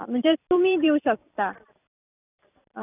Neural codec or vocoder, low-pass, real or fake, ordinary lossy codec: vocoder, 44.1 kHz, 128 mel bands every 256 samples, BigVGAN v2; 3.6 kHz; fake; none